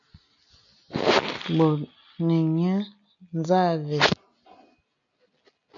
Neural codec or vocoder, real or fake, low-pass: none; real; 7.2 kHz